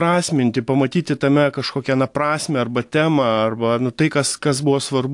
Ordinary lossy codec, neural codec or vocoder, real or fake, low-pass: AAC, 64 kbps; none; real; 10.8 kHz